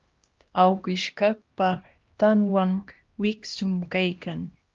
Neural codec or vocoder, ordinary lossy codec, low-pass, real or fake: codec, 16 kHz, 1 kbps, X-Codec, HuBERT features, trained on LibriSpeech; Opus, 32 kbps; 7.2 kHz; fake